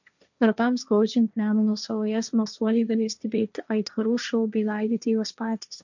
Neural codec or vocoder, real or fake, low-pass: codec, 16 kHz, 1.1 kbps, Voila-Tokenizer; fake; 7.2 kHz